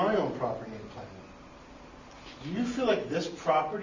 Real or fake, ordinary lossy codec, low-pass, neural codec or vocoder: real; Opus, 64 kbps; 7.2 kHz; none